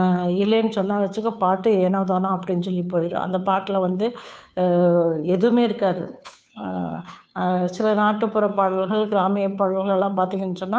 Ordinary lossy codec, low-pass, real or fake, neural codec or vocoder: none; none; fake; codec, 16 kHz, 2 kbps, FunCodec, trained on Chinese and English, 25 frames a second